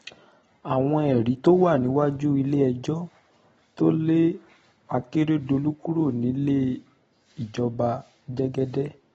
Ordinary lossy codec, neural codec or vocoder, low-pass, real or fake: AAC, 24 kbps; none; 19.8 kHz; real